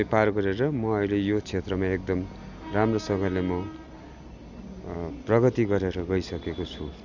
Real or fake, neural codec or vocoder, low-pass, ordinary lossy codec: real; none; 7.2 kHz; none